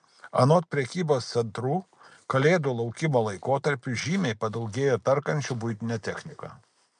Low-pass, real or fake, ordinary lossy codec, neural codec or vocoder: 9.9 kHz; real; MP3, 96 kbps; none